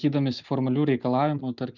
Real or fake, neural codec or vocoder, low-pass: real; none; 7.2 kHz